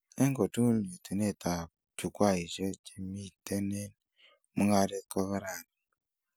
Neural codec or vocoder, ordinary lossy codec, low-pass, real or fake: none; none; none; real